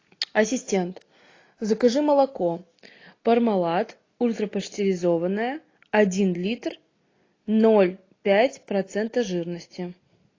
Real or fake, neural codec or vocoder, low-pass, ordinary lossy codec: real; none; 7.2 kHz; AAC, 32 kbps